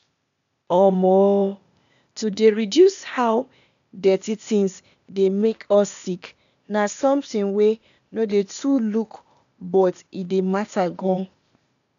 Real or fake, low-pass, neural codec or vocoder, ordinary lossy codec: fake; 7.2 kHz; codec, 16 kHz, 0.8 kbps, ZipCodec; none